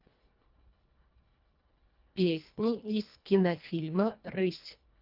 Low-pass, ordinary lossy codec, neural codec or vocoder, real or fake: 5.4 kHz; Opus, 24 kbps; codec, 24 kHz, 1.5 kbps, HILCodec; fake